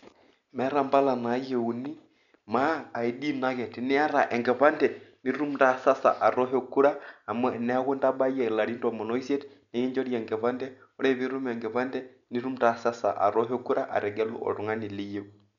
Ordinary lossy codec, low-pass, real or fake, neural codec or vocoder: none; 7.2 kHz; real; none